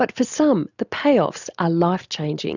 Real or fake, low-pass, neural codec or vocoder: real; 7.2 kHz; none